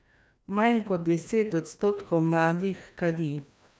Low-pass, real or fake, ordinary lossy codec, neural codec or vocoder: none; fake; none; codec, 16 kHz, 1 kbps, FreqCodec, larger model